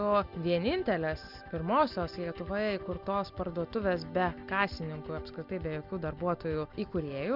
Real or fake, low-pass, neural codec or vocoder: real; 5.4 kHz; none